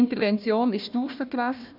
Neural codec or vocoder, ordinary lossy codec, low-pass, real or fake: codec, 16 kHz, 1 kbps, FunCodec, trained on Chinese and English, 50 frames a second; none; 5.4 kHz; fake